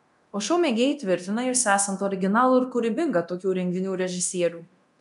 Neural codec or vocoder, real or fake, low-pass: codec, 24 kHz, 0.9 kbps, DualCodec; fake; 10.8 kHz